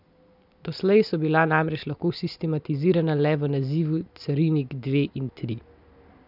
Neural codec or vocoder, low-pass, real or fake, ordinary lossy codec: none; 5.4 kHz; real; none